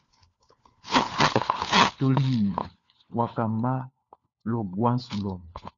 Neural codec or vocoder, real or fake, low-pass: codec, 16 kHz, 4 kbps, FunCodec, trained on LibriTTS, 50 frames a second; fake; 7.2 kHz